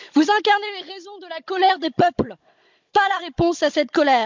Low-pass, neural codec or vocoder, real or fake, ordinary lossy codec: 7.2 kHz; codec, 16 kHz, 16 kbps, FunCodec, trained on Chinese and English, 50 frames a second; fake; MP3, 64 kbps